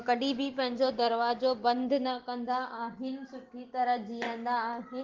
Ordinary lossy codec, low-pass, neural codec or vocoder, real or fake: Opus, 16 kbps; 7.2 kHz; none; real